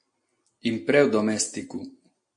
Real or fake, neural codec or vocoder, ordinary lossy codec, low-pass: real; none; MP3, 48 kbps; 9.9 kHz